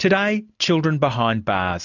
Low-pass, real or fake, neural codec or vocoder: 7.2 kHz; real; none